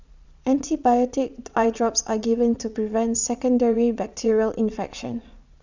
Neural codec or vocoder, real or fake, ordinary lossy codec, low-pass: vocoder, 22.05 kHz, 80 mel bands, Vocos; fake; none; 7.2 kHz